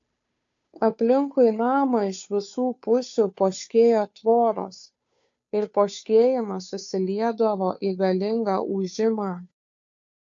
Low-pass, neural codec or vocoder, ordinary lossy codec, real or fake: 7.2 kHz; codec, 16 kHz, 2 kbps, FunCodec, trained on Chinese and English, 25 frames a second; AAC, 64 kbps; fake